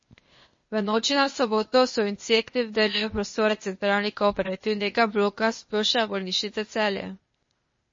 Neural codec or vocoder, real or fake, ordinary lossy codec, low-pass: codec, 16 kHz, 0.8 kbps, ZipCodec; fake; MP3, 32 kbps; 7.2 kHz